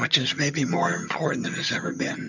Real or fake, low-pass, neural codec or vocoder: fake; 7.2 kHz; vocoder, 22.05 kHz, 80 mel bands, HiFi-GAN